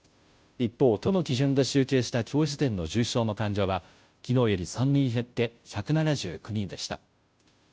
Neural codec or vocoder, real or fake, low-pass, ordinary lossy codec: codec, 16 kHz, 0.5 kbps, FunCodec, trained on Chinese and English, 25 frames a second; fake; none; none